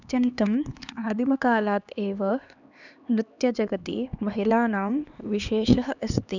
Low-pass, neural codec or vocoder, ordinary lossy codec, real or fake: 7.2 kHz; codec, 16 kHz, 2 kbps, X-Codec, HuBERT features, trained on LibriSpeech; none; fake